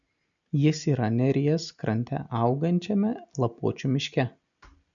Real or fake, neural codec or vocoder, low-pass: real; none; 7.2 kHz